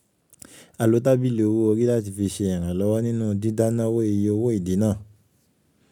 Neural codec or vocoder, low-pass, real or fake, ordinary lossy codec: none; 19.8 kHz; real; none